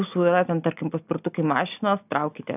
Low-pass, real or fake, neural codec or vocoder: 3.6 kHz; real; none